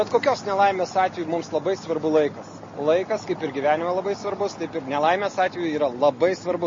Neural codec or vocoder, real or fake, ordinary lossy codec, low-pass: none; real; MP3, 32 kbps; 7.2 kHz